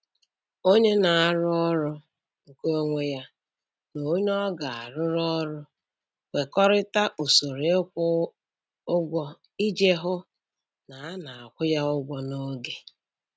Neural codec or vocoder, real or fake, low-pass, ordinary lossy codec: none; real; none; none